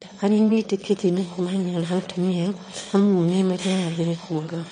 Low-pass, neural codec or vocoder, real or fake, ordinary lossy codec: 9.9 kHz; autoencoder, 22.05 kHz, a latent of 192 numbers a frame, VITS, trained on one speaker; fake; MP3, 48 kbps